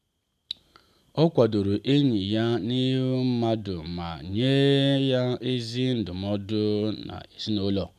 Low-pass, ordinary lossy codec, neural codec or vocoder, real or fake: 14.4 kHz; none; none; real